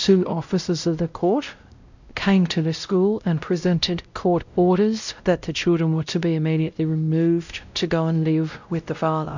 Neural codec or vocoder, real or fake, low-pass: codec, 16 kHz, 0.5 kbps, X-Codec, WavLM features, trained on Multilingual LibriSpeech; fake; 7.2 kHz